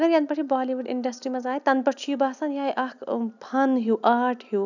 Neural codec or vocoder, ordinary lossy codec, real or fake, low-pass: none; none; real; 7.2 kHz